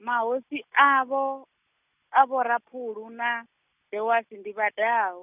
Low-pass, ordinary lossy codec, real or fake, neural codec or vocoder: 3.6 kHz; none; real; none